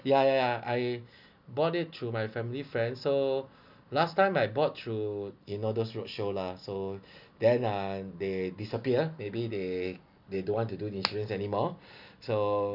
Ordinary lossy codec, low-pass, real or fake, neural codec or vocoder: none; 5.4 kHz; real; none